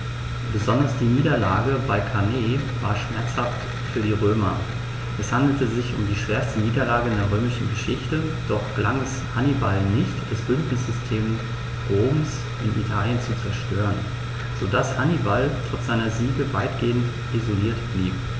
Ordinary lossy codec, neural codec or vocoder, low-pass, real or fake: none; none; none; real